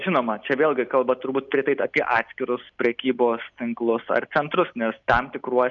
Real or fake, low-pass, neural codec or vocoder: real; 7.2 kHz; none